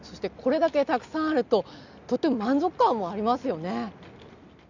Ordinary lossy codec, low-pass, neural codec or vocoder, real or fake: none; 7.2 kHz; none; real